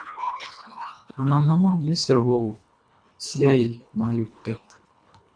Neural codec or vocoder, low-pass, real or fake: codec, 24 kHz, 1.5 kbps, HILCodec; 9.9 kHz; fake